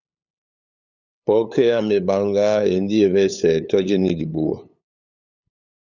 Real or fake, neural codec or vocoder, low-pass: fake; codec, 16 kHz, 8 kbps, FunCodec, trained on LibriTTS, 25 frames a second; 7.2 kHz